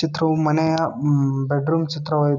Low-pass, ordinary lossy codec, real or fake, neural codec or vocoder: 7.2 kHz; none; real; none